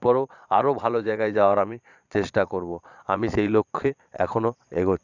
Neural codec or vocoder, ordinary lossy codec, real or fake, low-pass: vocoder, 22.05 kHz, 80 mel bands, Vocos; none; fake; 7.2 kHz